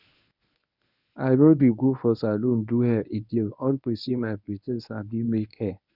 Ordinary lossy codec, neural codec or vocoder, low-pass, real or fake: none; codec, 24 kHz, 0.9 kbps, WavTokenizer, medium speech release version 1; 5.4 kHz; fake